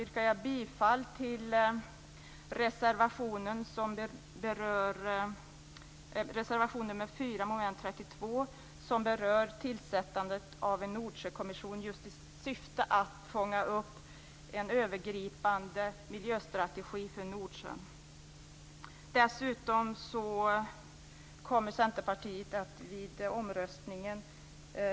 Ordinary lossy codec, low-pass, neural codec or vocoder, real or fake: none; none; none; real